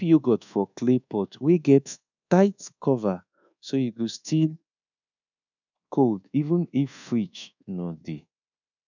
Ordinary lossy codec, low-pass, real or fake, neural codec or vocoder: none; 7.2 kHz; fake; codec, 24 kHz, 1.2 kbps, DualCodec